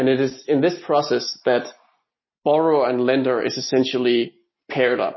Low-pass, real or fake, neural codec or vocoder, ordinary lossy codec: 7.2 kHz; real; none; MP3, 24 kbps